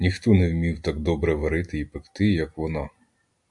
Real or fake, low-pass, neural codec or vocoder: real; 10.8 kHz; none